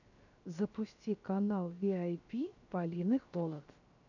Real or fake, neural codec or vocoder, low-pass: fake; codec, 16 kHz, 0.7 kbps, FocalCodec; 7.2 kHz